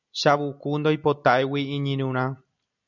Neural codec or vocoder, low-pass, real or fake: none; 7.2 kHz; real